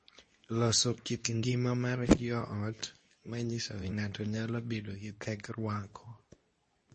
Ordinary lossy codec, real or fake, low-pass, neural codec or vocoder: MP3, 32 kbps; fake; 10.8 kHz; codec, 24 kHz, 0.9 kbps, WavTokenizer, medium speech release version 2